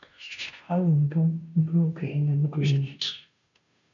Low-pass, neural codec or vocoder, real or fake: 7.2 kHz; codec, 16 kHz, 0.5 kbps, FunCodec, trained on Chinese and English, 25 frames a second; fake